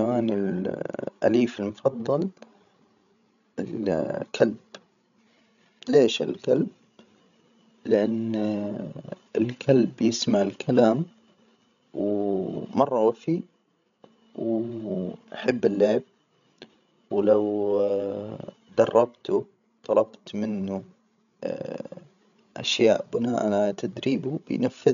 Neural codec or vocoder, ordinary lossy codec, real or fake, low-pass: codec, 16 kHz, 16 kbps, FreqCodec, larger model; none; fake; 7.2 kHz